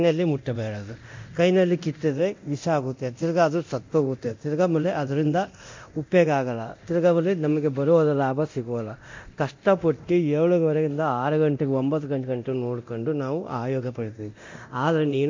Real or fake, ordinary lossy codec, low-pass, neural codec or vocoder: fake; MP3, 48 kbps; 7.2 kHz; codec, 24 kHz, 0.9 kbps, DualCodec